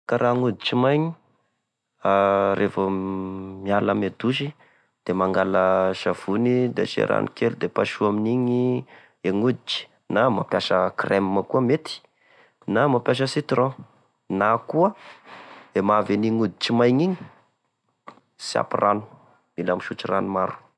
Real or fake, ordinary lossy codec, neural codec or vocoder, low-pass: real; none; none; none